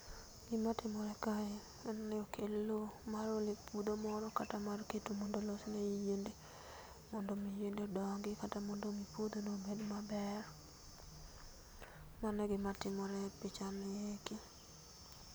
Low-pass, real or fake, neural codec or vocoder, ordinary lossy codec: none; real; none; none